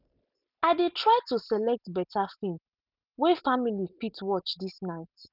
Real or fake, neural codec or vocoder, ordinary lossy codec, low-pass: real; none; none; 5.4 kHz